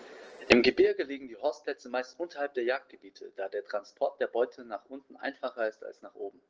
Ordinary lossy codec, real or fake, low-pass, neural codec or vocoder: Opus, 16 kbps; real; 7.2 kHz; none